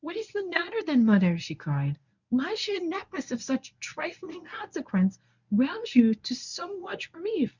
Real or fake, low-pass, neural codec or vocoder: fake; 7.2 kHz; codec, 24 kHz, 0.9 kbps, WavTokenizer, medium speech release version 1